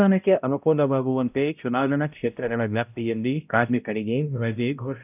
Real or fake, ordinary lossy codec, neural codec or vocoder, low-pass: fake; none; codec, 16 kHz, 0.5 kbps, X-Codec, HuBERT features, trained on balanced general audio; 3.6 kHz